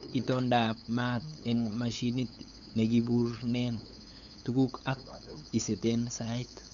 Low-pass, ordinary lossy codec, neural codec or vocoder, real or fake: 7.2 kHz; none; codec, 16 kHz, 8 kbps, FunCodec, trained on LibriTTS, 25 frames a second; fake